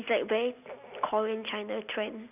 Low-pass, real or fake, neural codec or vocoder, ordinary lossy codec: 3.6 kHz; real; none; none